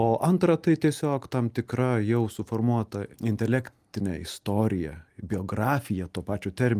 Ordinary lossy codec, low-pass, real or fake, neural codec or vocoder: Opus, 32 kbps; 14.4 kHz; real; none